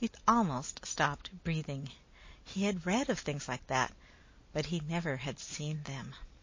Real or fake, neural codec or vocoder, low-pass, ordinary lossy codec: real; none; 7.2 kHz; MP3, 32 kbps